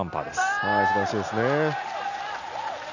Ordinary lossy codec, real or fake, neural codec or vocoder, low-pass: none; real; none; 7.2 kHz